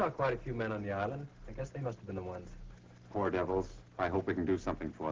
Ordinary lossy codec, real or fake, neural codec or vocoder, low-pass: Opus, 16 kbps; real; none; 7.2 kHz